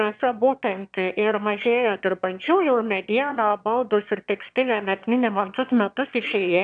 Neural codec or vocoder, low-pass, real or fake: autoencoder, 22.05 kHz, a latent of 192 numbers a frame, VITS, trained on one speaker; 9.9 kHz; fake